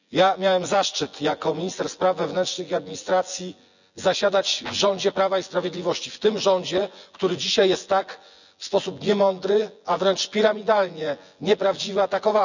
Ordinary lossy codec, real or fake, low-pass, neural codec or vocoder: none; fake; 7.2 kHz; vocoder, 24 kHz, 100 mel bands, Vocos